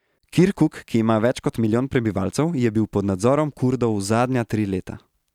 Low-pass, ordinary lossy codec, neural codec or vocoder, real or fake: 19.8 kHz; none; none; real